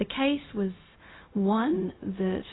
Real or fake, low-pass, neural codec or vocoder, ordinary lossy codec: fake; 7.2 kHz; codec, 16 kHz, 0.4 kbps, LongCat-Audio-Codec; AAC, 16 kbps